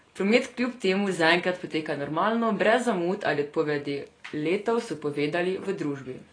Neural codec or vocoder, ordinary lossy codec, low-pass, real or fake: none; AAC, 32 kbps; 9.9 kHz; real